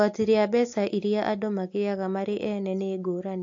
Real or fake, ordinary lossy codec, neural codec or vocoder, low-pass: real; AAC, 48 kbps; none; 7.2 kHz